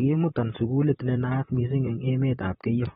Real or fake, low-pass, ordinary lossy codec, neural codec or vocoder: fake; 19.8 kHz; AAC, 16 kbps; vocoder, 44.1 kHz, 128 mel bands, Pupu-Vocoder